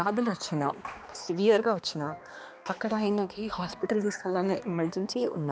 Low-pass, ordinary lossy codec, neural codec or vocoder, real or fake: none; none; codec, 16 kHz, 2 kbps, X-Codec, HuBERT features, trained on balanced general audio; fake